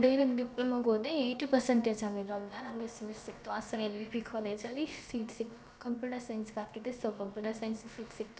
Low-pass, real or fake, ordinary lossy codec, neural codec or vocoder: none; fake; none; codec, 16 kHz, about 1 kbps, DyCAST, with the encoder's durations